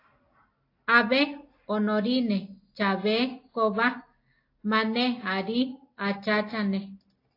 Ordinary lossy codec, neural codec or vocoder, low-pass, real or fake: AAC, 32 kbps; none; 5.4 kHz; real